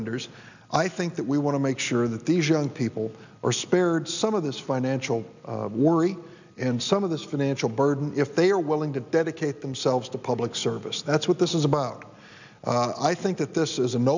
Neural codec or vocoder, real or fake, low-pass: none; real; 7.2 kHz